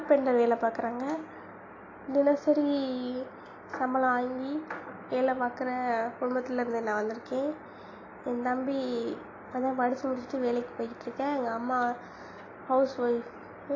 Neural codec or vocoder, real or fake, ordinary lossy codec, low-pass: none; real; AAC, 32 kbps; 7.2 kHz